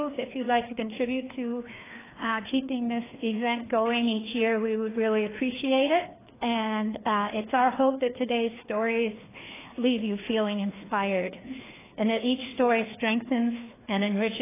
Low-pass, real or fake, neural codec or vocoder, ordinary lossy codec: 3.6 kHz; fake; codec, 16 kHz, 2 kbps, FreqCodec, larger model; AAC, 16 kbps